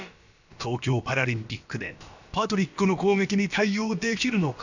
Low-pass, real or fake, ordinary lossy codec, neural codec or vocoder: 7.2 kHz; fake; none; codec, 16 kHz, about 1 kbps, DyCAST, with the encoder's durations